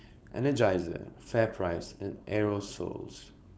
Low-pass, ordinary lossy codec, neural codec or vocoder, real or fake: none; none; codec, 16 kHz, 4.8 kbps, FACodec; fake